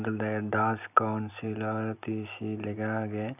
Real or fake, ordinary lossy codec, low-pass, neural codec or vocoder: real; none; 3.6 kHz; none